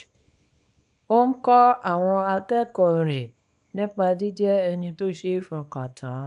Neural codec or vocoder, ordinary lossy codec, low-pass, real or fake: codec, 24 kHz, 0.9 kbps, WavTokenizer, small release; none; 10.8 kHz; fake